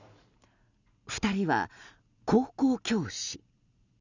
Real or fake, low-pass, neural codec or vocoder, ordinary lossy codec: real; 7.2 kHz; none; none